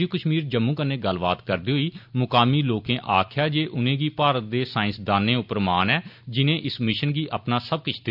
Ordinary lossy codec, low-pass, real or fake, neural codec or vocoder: AAC, 48 kbps; 5.4 kHz; real; none